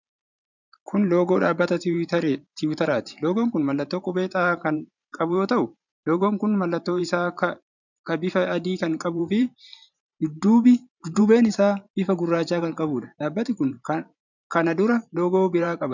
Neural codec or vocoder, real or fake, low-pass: vocoder, 44.1 kHz, 128 mel bands every 512 samples, BigVGAN v2; fake; 7.2 kHz